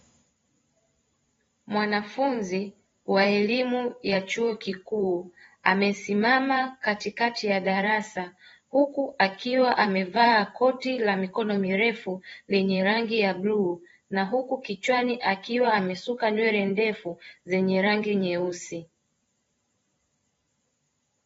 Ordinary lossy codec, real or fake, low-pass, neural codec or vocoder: AAC, 24 kbps; fake; 19.8 kHz; vocoder, 44.1 kHz, 128 mel bands every 512 samples, BigVGAN v2